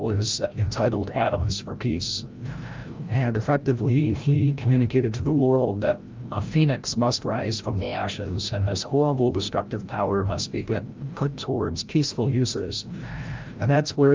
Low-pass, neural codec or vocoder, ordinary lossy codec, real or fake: 7.2 kHz; codec, 16 kHz, 0.5 kbps, FreqCodec, larger model; Opus, 32 kbps; fake